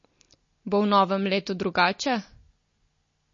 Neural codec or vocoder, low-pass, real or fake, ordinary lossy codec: none; 7.2 kHz; real; MP3, 32 kbps